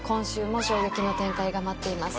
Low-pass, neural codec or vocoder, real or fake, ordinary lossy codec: none; none; real; none